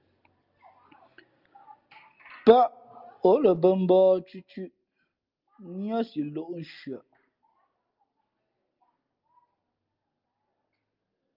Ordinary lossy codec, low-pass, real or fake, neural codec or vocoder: Opus, 24 kbps; 5.4 kHz; real; none